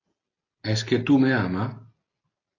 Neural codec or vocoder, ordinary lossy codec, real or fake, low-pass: none; AAC, 32 kbps; real; 7.2 kHz